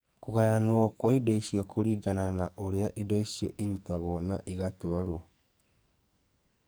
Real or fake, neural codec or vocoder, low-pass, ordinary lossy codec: fake; codec, 44.1 kHz, 2.6 kbps, SNAC; none; none